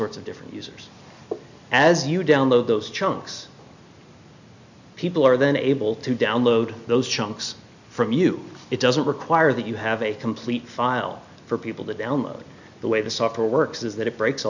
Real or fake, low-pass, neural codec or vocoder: real; 7.2 kHz; none